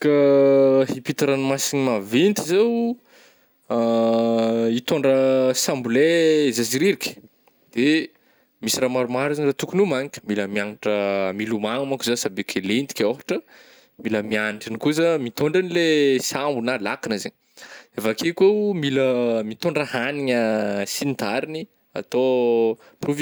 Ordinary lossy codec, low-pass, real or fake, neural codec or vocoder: none; none; real; none